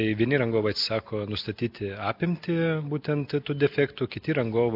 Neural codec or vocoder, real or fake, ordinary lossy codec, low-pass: none; real; AAC, 48 kbps; 5.4 kHz